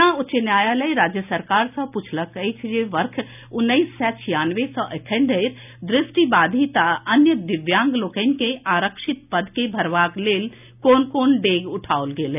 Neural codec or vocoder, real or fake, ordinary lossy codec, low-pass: none; real; none; 3.6 kHz